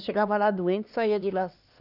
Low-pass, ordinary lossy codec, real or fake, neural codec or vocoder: 5.4 kHz; none; fake; codec, 16 kHz, 2 kbps, X-Codec, HuBERT features, trained on LibriSpeech